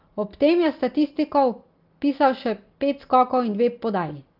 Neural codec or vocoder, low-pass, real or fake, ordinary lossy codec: none; 5.4 kHz; real; Opus, 24 kbps